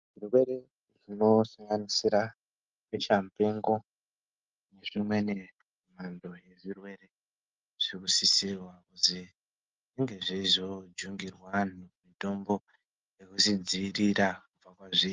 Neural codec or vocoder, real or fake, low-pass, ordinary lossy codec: none; real; 7.2 kHz; Opus, 32 kbps